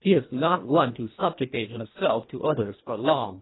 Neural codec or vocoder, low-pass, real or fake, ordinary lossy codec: codec, 24 kHz, 1.5 kbps, HILCodec; 7.2 kHz; fake; AAC, 16 kbps